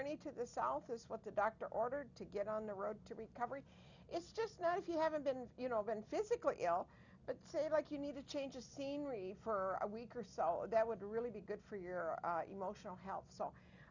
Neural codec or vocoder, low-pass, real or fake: none; 7.2 kHz; real